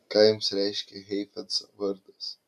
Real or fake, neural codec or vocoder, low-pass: real; none; 14.4 kHz